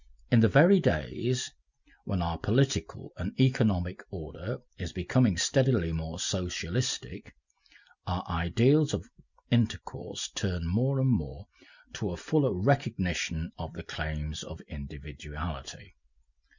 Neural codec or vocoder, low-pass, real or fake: none; 7.2 kHz; real